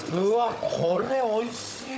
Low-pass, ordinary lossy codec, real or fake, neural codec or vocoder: none; none; fake; codec, 16 kHz, 16 kbps, FunCodec, trained on Chinese and English, 50 frames a second